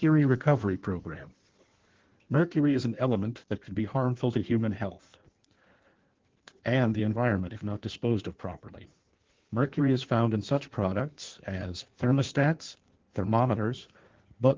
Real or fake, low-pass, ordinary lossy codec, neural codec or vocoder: fake; 7.2 kHz; Opus, 16 kbps; codec, 16 kHz in and 24 kHz out, 1.1 kbps, FireRedTTS-2 codec